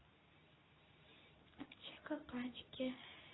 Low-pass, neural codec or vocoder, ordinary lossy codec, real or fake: 7.2 kHz; codec, 24 kHz, 0.9 kbps, WavTokenizer, medium speech release version 2; AAC, 16 kbps; fake